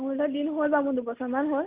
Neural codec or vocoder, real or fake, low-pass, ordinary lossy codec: none; real; 3.6 kHz; Opus, 32 kbps